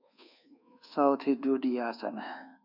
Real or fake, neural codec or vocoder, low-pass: fake; codec, 24 kHz, 1.2 kbps, DualCodec; 5.4 kHz